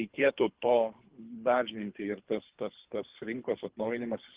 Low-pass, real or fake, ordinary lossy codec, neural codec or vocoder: 3.6 kHz; fake; Opus, 16 kbps; codec, 24 kHz, 3 kbps, HILCodec